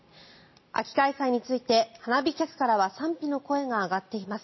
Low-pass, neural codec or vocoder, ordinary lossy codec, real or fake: 7.2 kHz; none; MP3, 24 kbps; real